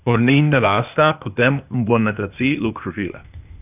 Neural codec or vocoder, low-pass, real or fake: codec, 16 kHz, 0.8 kbps, ZipCodec; 3.6 kHz; fake